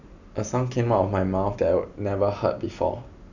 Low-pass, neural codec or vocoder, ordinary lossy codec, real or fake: 7.2 kHz; none; none; real